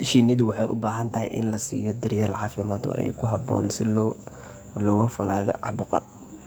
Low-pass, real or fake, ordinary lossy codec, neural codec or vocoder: none; fake; none; codec, 44.1 kHz, 2.6 kbps, SNAC